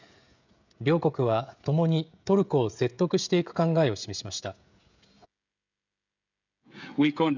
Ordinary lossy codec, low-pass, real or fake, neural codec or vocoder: none; 7.2 kHz; fake; codec, 16 kHz, 16 kbps, FreqCodec, smaller model